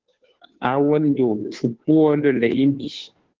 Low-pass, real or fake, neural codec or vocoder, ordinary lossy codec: 7.2 kHz; fake; codec, 16 kHz, 2 kbps, FunCodec, trained on Chinese and English, 25 frames a second; Opus, 16 kbps